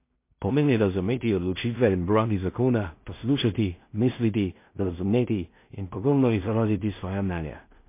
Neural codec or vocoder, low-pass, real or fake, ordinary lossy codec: codec, 16 kHz in and 24 kHz out, 0.4 kbps, LongCat-Audio-Codec, two codebook decoder; 3.6 kHz; fake; MP3, 24 kbps